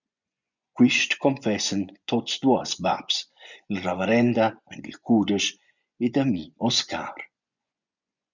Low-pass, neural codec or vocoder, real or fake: 7.2 kHz; vocoder, 24 kHz, 100 mel bands, Vocos; fake